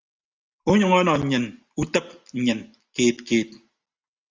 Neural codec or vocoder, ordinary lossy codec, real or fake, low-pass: none; Opus, 32 kbps; real; 7.2 kHz